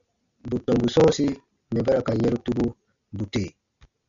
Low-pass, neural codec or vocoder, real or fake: 7.2 kHz; none; real